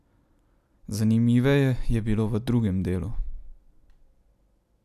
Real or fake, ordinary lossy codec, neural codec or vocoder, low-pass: real; none; none; 14.4 kHz